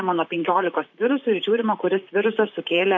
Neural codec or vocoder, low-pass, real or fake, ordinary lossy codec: vocoder, 44.1 kHz, 128 mel bands, Pupu-Vocoder; 7.2 kHz; fake; MP3, 48 kbps